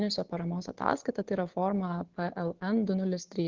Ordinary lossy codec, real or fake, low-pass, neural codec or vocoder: Opus, 32 kbps; real; 7.2 kHz; none